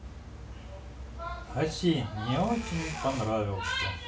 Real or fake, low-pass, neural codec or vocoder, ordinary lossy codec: real; none; none; none